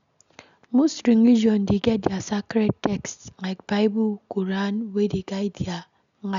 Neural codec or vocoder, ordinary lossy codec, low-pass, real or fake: none; none; 7.2 kHz; real